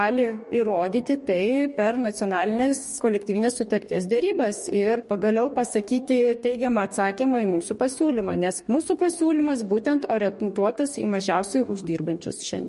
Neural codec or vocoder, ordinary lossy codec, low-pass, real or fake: codec, 44.1 kHz, 2.6 kbps, DAC; MP3, 48 kbps; 14.4 kHz; fake